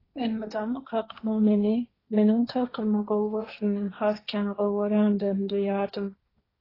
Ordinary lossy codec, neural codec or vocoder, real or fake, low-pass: AAC, 24 kbps; codec, 16 kHz, 1.1 kbps, Voila-Tokenizer; fake; 5.4 kHz